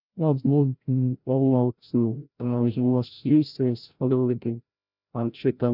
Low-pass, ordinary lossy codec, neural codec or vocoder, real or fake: 5.4 kHz; none; codec, 16 kHz, 0.5 kbps, FreqCodec, larger model; fake